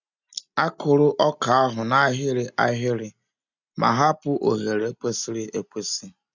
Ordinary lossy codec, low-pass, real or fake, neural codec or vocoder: none; 7.2 kHz; real; none